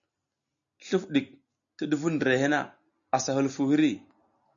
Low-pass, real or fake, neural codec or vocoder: 7.2 kHz; real; none